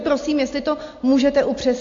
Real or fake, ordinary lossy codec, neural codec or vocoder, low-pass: real; AAC, 48 kbps; none; 7.2 kHz